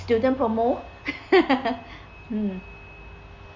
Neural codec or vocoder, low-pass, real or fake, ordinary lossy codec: none; 7.2 kHz; real; Opus, 64 kbps